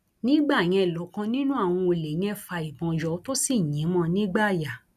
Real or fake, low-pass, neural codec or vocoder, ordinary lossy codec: real; 14.4 kHz; none; none